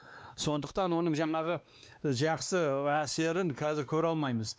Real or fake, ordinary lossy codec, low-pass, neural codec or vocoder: fake; none; none; codec, 16 kHz, 2 kbps, X-Codec, WavLM features, trained on Multilingual LibriSpeech